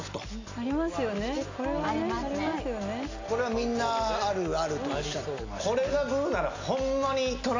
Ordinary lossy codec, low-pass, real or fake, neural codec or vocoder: AAC, 48 kbps; 7.2 kHz; real; none